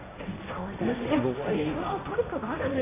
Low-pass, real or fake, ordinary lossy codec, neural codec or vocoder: 3.6 kHz; fake; AAC, 16 kbps; codec, 16 kHz, 1.1 kbps, Voila-Tokenizer